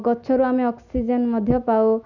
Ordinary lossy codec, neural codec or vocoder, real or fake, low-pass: none; none; real; 7.2 kHz